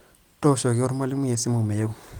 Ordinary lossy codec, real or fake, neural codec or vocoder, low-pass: Opus, 24 kbps; real; none; 19.8 kHz